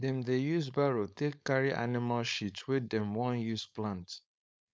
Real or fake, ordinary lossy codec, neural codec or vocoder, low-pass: fake; none; codec, 16 kHz, 4.8 kbps, FACodec; none